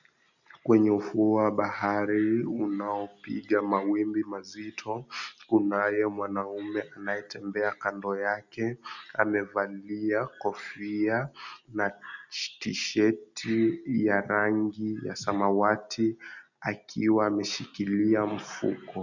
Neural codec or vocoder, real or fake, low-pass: none; real; 7.2 kHz